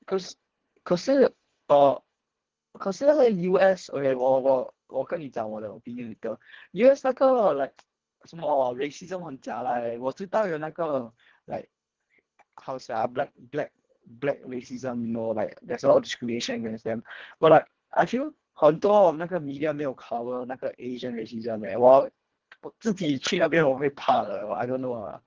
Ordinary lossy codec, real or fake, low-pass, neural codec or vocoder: Opus, 16 kbps; fake; 7.2 kHz; codec, 24 kHz, 1.5 kbps, HILCodec